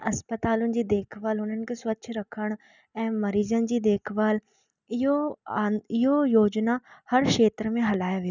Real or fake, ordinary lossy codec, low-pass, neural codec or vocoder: real; none; 7.2 kHz; none